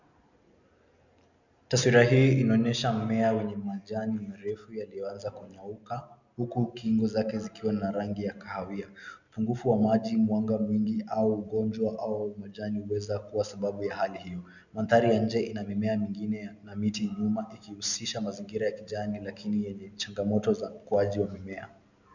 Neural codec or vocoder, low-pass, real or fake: none; 7.2 kHz; real